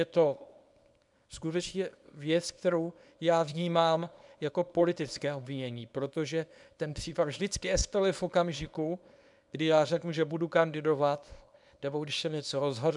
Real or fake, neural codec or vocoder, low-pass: fake; codec, 24 kHz, 0.9 kbps, WavTokenizer, small release; 10.8 kHz